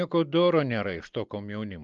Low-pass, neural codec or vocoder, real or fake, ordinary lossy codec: 7.2 kHz; none; real; Opus, 32 kbps